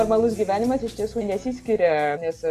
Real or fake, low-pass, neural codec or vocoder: real; 14.4 kHz; none